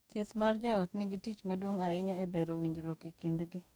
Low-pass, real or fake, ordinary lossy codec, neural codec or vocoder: none; fake; none; codec, 44.1 kHz, 2.6 kbps, DAC